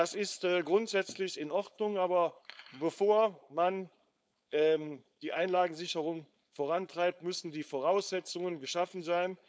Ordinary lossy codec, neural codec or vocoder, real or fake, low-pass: none; codec, 16 kHz, 4.8 kbps, FACodec; fake; none